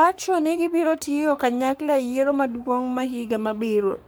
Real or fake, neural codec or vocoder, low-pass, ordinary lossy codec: fake; codec, 44.1 kHz, 3.4 kbps, Pupu-Codec; none; none